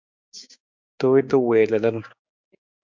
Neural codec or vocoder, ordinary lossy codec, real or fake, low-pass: codec, 16 kHz, 6 kbps, DAC; MP3, 64 kbps; fake; 7.2 kHz